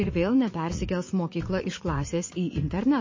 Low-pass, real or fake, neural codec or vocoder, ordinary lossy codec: 7.2 kHz; fake; vocoder, 44.1 kHz, 80 mel bands, Vocos; MP3, 32 kbps